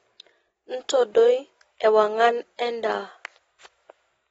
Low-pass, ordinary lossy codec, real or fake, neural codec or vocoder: 19.8 kHz; AAC, 24 kbps; real; none